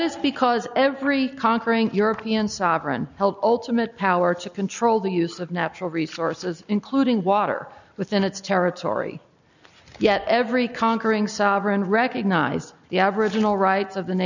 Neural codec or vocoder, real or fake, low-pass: none; real; 7.2 kHz